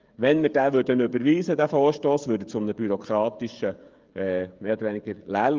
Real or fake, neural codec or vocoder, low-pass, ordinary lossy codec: fake; codec, 16 kHz, 16 kbps, FreqCodec, smaller model; 7.2 kHz; Opus, 32 kbps